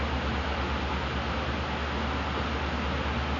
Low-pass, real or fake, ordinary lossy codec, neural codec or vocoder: 7.2 kHz; real; none; none